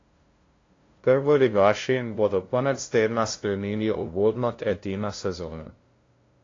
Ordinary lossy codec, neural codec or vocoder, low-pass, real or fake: AAC, 32 kbps; codec, 16 kHz, 0.5 kbps, FunCodec, trained on LibriTTS, 25 frames a second; 7.2 kHz; fake